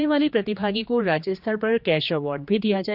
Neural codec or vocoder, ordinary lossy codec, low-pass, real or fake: codec, 16 kHz, 2 kbps, X-Codec, HuBERT features, trained on general audio; none; 5.4 kHz; fake